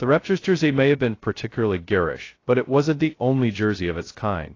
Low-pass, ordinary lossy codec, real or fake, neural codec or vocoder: 7.2 kHz; AAC, 32 kbps; fake; codec, 16 kHz, 0.2 kbps, FocalCodec